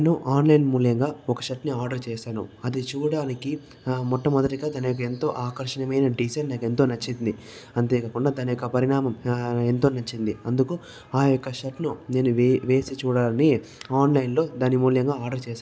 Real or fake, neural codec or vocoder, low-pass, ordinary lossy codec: real; none; none; none